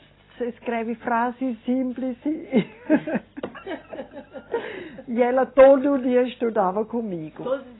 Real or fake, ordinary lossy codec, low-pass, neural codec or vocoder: real; AAC, 16 kbps; 7.2 kHz; none